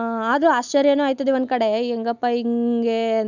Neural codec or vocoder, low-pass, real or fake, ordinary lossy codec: none; 7.2 kHz; real; none